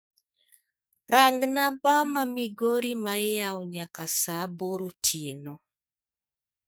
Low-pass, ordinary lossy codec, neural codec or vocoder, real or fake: none; none; codec, 44.1 kHz, 2.6 kbps, SNAC; fake